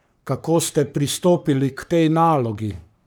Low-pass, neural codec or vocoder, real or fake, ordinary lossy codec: none; codec, 44.1 kHz, 3.4 kbps, Pupu-Codec; fake; none